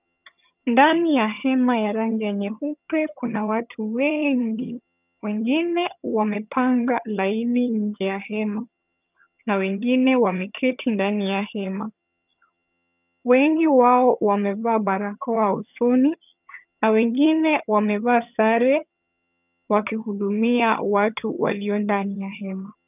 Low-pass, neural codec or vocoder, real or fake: 3.6 kHz; vocoder, 22.05 kHz, 80 mel bands, HiFi-GAN; fake